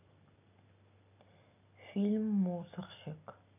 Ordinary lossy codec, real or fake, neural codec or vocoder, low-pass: none; real; none; 3.6 kHz